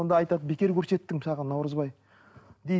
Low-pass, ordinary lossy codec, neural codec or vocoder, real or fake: none; none; none; real